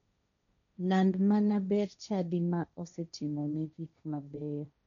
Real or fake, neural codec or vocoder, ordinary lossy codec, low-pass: fake; codec, 16 kHz, 1.1 kbps, Voila-Tokenizer; none; 7.2 kHz